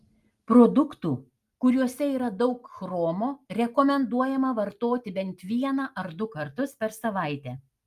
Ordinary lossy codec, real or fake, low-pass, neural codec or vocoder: Opus, 32 kbps; real; 14.4 kHz; none